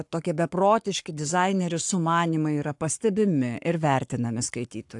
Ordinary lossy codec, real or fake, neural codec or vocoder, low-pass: AAC, 64 kbps; fake; codec, 44.1 kHz, 7.8 kbps, Pupu-Codec; 10.8 kHz